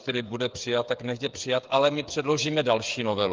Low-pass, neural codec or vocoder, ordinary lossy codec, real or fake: 7.2 kHz; codec, 16 kHz, 8 kbps, FreqCodec, smaller model; Opus, 24 kbps; fake